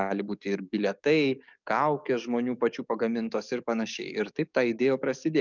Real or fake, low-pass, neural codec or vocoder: fake; 7.2 kHz; codec, 44.1 kHz, 7.8 kbps, DAC